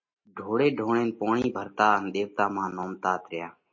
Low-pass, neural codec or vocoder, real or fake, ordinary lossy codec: 7.2 kHz; none; real; MP3, 32 kbps